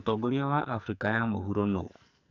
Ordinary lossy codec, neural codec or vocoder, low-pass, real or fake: none; codec, 44.1 kHz, 2.6 kbps, SNAC; 7.2 kHz; fake